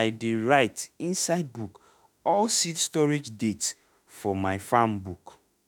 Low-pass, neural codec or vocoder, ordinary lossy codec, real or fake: none; autoencoder, 48 kHz, 32 numbers a frame, DAC-VAE, trained on Japanese speech; none; fake